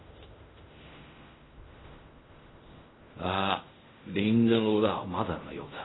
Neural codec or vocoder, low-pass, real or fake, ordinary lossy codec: codec, 16 kHz in and 24 kHz out, 0.4 kbps, LongCat-Audio-Codec, fine tuned four codebook decoder; 7.2 kHz; fake; AAC, 16 kbps